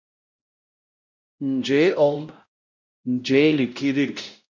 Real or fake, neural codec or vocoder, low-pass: fake; codec, 16 kHz, 0.5 kbps, X-Codec, WavLM features, trained on Multilingual LibriSpeech; 7.2 kHz